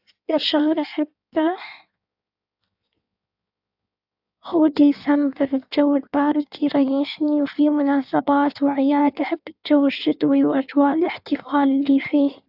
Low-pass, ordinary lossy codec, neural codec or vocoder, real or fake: 5.4 kHz; AAC, 48 kbps; codec, 16 kHz in and 24 kHz out, 1.1 kbps, FireRedTTS-2 codec; fake